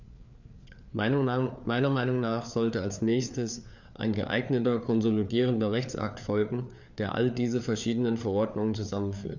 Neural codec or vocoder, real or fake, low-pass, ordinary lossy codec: codec, 16 kHz, 4 kbps, FreqCodec, larger model; fake; 7.2 kHz; none